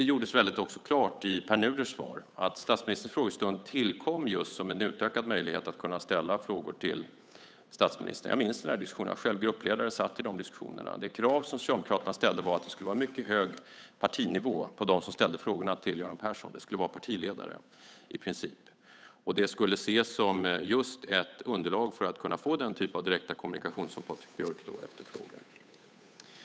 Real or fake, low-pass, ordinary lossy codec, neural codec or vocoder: fake; none; none; codec, 16 kHz, 8 kbps, FunCodec, trained on Chinese and English, 25 frames a second